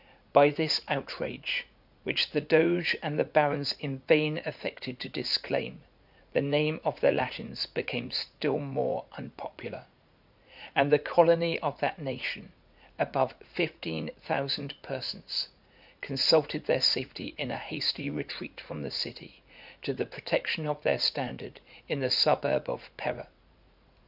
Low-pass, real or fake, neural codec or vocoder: 5.4 kHz; fake; vocoder, 44.1 kHz, 80 mel bands, Vocos